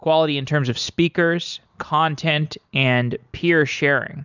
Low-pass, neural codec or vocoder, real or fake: 7.2 kHz; none; real